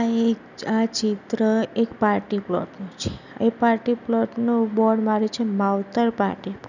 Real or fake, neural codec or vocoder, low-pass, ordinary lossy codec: real; none; 7.2 kHz; none